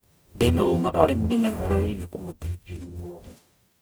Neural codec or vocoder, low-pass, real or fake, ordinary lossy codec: codec, 44.1 kHz, 0.9 kbps, DAC; none; fake; none